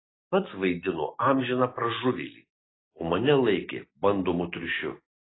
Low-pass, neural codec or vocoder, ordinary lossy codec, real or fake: 7.2 kHz; none; AAC, 16 kbps; real